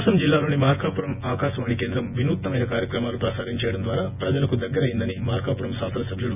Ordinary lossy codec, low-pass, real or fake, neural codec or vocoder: none; 3.6 kHz; fake; vocoder, 24 kHz, 100 mel bands, Vocos